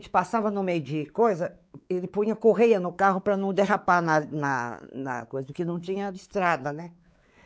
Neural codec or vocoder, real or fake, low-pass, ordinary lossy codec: codec, 16 kHz, 4 kbps, X-Codec, WavLM features, trained on Multilingual LibriSpeech; fake; none; none